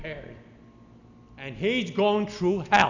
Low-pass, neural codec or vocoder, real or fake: 7.2 kHz; none; real